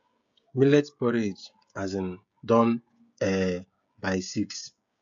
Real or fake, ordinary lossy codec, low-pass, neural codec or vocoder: fake; AAC, 64 kbps; 7.2 kHz; codec, 16 kHz, 16 kbps, FreqCodec, smaller model